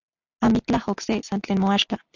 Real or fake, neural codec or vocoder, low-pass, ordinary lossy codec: real; none; 7.2 kHz; Opus, 64 kbps